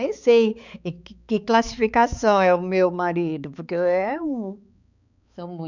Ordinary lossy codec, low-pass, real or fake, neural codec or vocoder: none; 7.2 kHz; fake; codec, 16 kHz, 4 kbps, X-Codec, HuBERT features, trained on balanced general audio